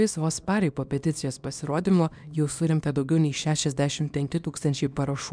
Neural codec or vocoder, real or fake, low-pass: codec, 24 kHz, 0.9 kbps, WavTokenizer, small release; fake; 9.9 kHz